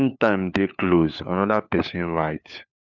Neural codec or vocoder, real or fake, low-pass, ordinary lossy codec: codec, 16 kHz, 4 kbps, FunCodec, trained on LibriTTS, 50 frames a second; fake; 7.2 kHz; none